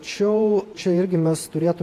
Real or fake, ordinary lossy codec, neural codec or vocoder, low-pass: fake; AAC, 64 kbps; vocoder, 48 kHz, 128 mel bands, Vocos; 14.4 kHz